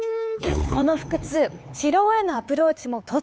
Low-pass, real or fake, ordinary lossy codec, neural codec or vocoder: none; fake; none; codec, 16 kHz, 4 kbps, X-Codec, HuBERT features, trained on LibriSpeech